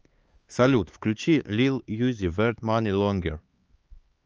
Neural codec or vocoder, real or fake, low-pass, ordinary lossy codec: codec, 16 kHz, 4 kbps, X-Codec, HuBERT features, trained on LibriSpeech; fake; 7.2 kHz; Opus, 24 kbps